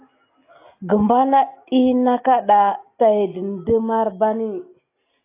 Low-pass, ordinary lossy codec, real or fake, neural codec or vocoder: 3.6 kHz; AAC, 24 kbps; real; none